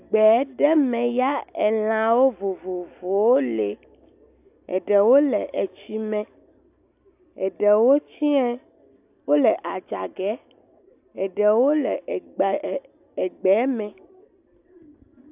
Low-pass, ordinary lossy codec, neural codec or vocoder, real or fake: 3.6 kHz; AAC, 32 kbps; none; real